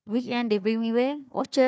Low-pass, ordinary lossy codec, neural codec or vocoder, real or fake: none; none; codec, 16 kHz, 1 kbps, FunCodec, trained on Chinese and English, 50 frames a second; fake